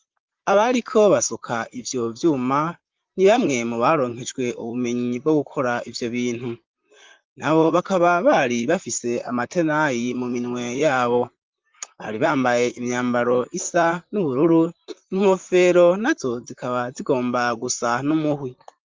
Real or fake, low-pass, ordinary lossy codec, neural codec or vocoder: fake; 7.2 kHz; Opus, 32 kbps; vocoder, 44.1 kHz, 128 mel bands, Pupu-Vocoder